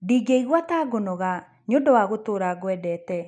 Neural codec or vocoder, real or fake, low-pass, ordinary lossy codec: none; real; 10.8 kHz; none